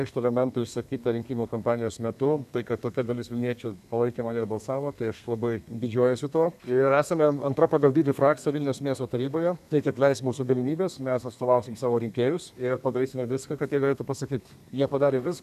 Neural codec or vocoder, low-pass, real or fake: codec, 32 kHz, 1.9 kbps, SNAC; 14.4 kHz; fake